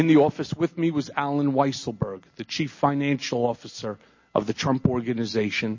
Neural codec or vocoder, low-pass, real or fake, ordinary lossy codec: none; 7.2 kHz; real; MP3, 32 kbps